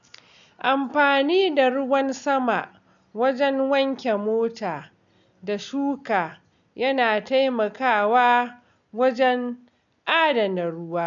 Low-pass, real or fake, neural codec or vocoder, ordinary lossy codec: 7.2 kHz; real; none; none